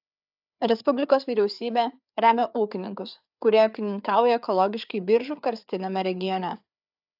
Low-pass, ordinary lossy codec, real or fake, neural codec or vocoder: 5.4 kHz; AAC, 48 kbps; fake; codec, 16 kHz, 4 kbps, FreqCodec, larger model